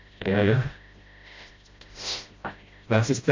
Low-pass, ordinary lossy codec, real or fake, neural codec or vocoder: 7.2 kHz; MP3, 48 kbps; fake; codec, 16 kHz, 0.5 kbps, FreqCodec, smaller model